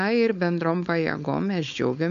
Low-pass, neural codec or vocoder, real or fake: 7.2 kHz; codec, 16 kHz, 4.8 kbps, FACodec; fake